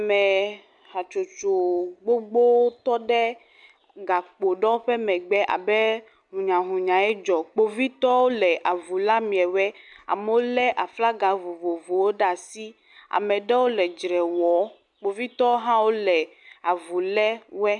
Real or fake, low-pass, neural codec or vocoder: real; 10.8 kHz; none